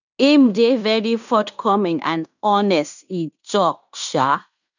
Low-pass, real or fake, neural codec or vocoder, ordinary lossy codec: 7.2 kHz; fake; codec, 16 kHz in and 24 kHz out, 0.9 kbps, LongCat-Audio-Codec, fine tuned four codebook decoder; none